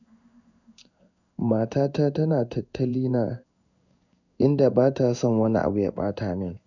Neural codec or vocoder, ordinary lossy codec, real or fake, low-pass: codec, 16 kHz in and 24 kHz out, 1 kbps, XY-Tokenizer; none; fake; 7.2 kHz